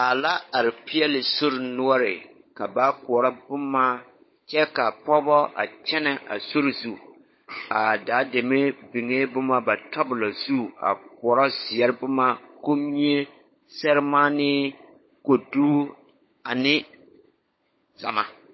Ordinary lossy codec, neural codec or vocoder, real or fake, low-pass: MP3, 24 kbps; codec, 16 kHz, 16 kbps, FunCodec, trained on LibriTTS, 50 frames a second; fake; 7.2 kHz